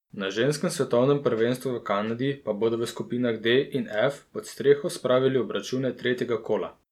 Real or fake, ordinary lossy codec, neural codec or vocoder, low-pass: real; none; none; 19.8 kHz